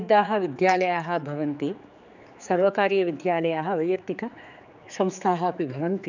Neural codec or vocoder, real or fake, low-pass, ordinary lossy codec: codec, 16 kHz, 4 kbps, X-Codec, HuBERT features, trained on general audio; fake; 7.2 kHz; none